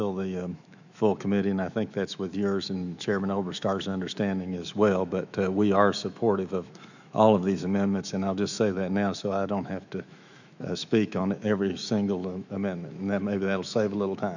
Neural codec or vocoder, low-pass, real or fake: autoencoder, 48 kHz, 128 numbers a frame, DAC-VAE, trained on Japanese speech; 7.2 kHz; fake